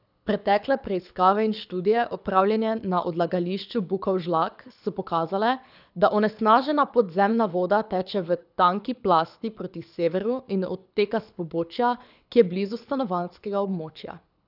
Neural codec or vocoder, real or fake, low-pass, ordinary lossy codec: codec, 24 kHz, 6 kbps, HILCodec; fake; 5.4 kHz; none